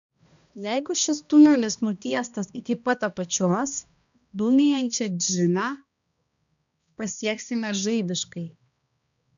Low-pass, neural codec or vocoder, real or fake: 7.2 kHz; codec, 16 kHz, 1 kbps, X-Codec, HuBERT features, trained on balanced general audio; fake